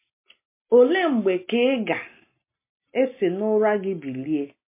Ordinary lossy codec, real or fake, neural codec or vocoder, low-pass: MP3, 24 kbps; real; none; 3.6 kHz